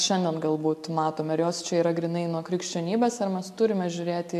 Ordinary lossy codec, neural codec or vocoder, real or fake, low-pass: AAC, 96 kbps; none; real; 14.4 kHz